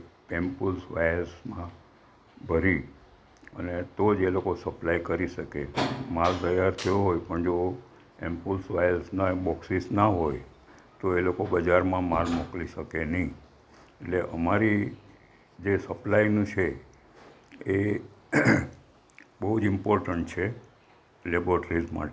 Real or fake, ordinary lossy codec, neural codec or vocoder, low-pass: real; none; none; none